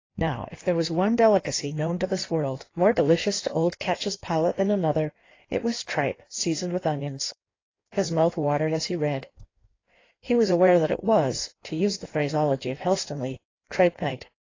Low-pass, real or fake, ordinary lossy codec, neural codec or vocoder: 7.2 kHz; fake; AAC, 32 kbps; codec, 16 kHz in and 24 kHz out, 1.1 kbps, FireRedTTS-2 codec